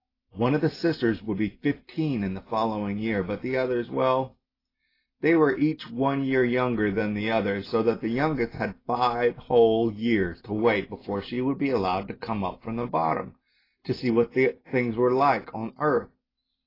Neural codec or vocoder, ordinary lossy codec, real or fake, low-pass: none; AAC, 24 kbps; real; 5.4 kHz